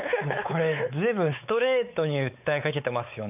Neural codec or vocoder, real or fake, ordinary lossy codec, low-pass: codec, 16 kHz, 4 kbps, X-Codec, WavLM features, trained on Multilingual LibriSpeech; fake; none; 3.6 kHz